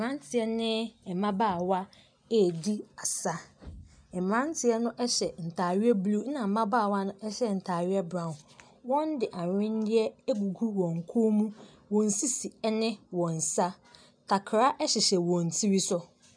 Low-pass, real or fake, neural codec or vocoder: 9.9 kHz; real; none